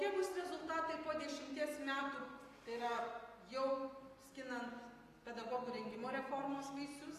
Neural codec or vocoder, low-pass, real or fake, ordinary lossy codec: none; 14.4 kHz; real; AAC, 48 kbps